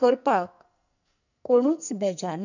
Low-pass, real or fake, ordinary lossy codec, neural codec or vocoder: 7.2 kHz; fake; none; codec, 44.1 kHz, 2.6 kbps, SNAC